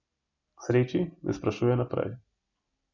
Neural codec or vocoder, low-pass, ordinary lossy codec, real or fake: none; 7.2 kHz; none; real